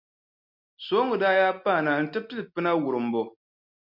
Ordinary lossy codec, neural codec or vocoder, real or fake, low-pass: MP3, 48 kbps; none; real; 5.4 kHz